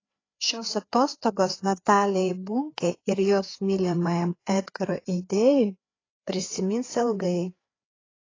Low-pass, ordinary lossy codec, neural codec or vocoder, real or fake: 7.2 kHz; AAC, 32 kbps; codec, 16 kHz, 4 kbps, FreqCodec, larger model; fake